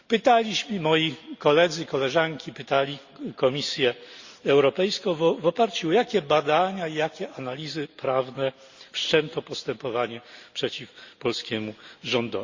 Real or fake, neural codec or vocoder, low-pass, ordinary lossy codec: real; none; 7.2 kHz; Opus, 64 kbps